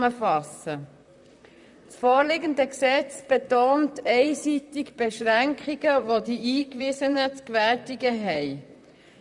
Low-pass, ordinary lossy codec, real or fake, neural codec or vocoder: 10.8 kHz; none; fake; vocoder, 44.1 kHz, 128 mel bands, Pupu-Vocoder